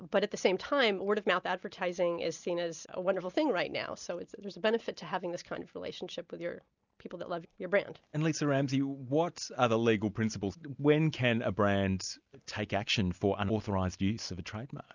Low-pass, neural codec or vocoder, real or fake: 7.2 kHz; none; real